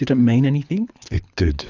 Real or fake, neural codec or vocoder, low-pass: fake; codec, 24 kHz, 6 kbps, HILCodec; 7.2 kHz